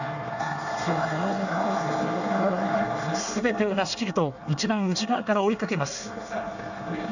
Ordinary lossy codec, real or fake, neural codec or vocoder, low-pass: none; fake; codec, 24 kHz, 1 kbps, SNAC; 7.2 kHz